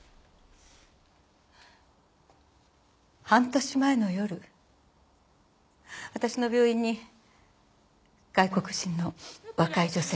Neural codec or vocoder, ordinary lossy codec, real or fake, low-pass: none; none; real; none